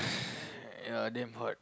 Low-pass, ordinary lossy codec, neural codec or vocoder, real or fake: none; none; none; real